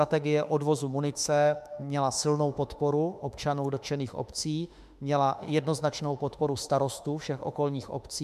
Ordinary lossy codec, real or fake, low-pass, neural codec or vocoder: MP3, 96 kbps; fake; 14.4 kHz; autoencoder, 48 kHz, 32 numbers a frame, DAC-VAE, trained on Japanese speech